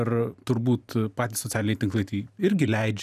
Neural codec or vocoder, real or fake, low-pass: vocoder, 44.1 kHz, 128 mel bands every 512 samples, BigVGAN v2; fake; 14.4 kHz